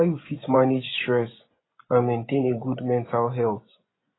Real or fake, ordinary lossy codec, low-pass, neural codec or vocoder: fake; AAC, 16 kbps; 7.2 kHz; vocoder, 24 kHz, 100 mel bands, Vocos